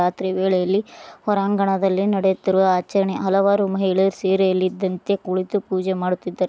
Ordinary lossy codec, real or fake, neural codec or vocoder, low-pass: none; real; none; none